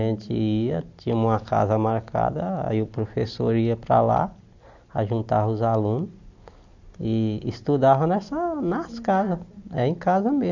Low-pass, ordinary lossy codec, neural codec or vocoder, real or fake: 7.2 kHz; none; none; real